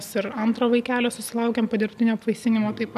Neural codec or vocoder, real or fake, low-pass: none; real; 14.4 kHz